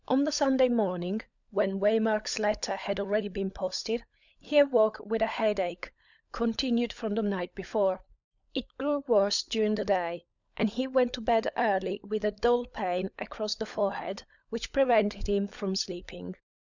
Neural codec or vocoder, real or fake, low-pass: codec, 16 kHz, 16 kbps, FunCodec, trained on LibriTTS, 50 frames a second; fake; 7.2 kHz